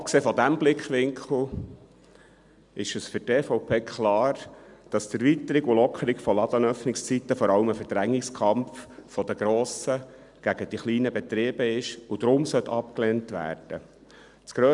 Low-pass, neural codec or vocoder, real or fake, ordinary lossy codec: 10.8 kHz; none; real; none